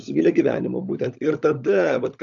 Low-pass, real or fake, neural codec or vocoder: 7.2 kHz; fake; codec, 16 kHz, 16 kbps, FunCodec, trained on LibriTTS, 50 frames a second